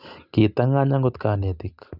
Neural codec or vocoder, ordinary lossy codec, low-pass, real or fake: none; none; 5.4 kHz; real